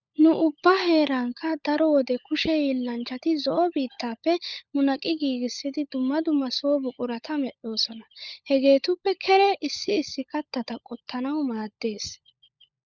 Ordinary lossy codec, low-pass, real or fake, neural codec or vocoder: Opus, 64 kbps; 7.2 kHz; fake; codec, 16 kHz, 16 kbps, FunCodec, trained on LibriTTS, 50 frames a second